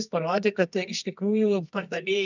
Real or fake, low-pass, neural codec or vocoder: fake; 7.2 kHz; codec, 24 kHz, 0.9 kbps, WavTokenizer, medium music audio release